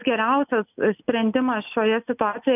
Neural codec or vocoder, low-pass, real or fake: none; 3.6 kHz; real